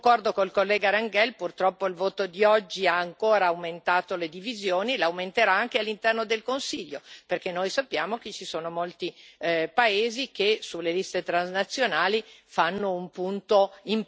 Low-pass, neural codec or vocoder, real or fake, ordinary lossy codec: none; none; real; none